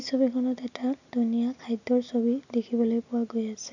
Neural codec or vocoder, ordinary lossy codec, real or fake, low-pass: none; none; real; 7.2 kHz